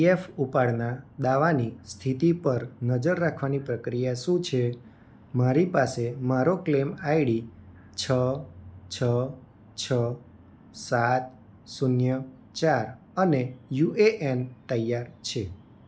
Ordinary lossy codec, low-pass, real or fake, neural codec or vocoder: none; none; real; none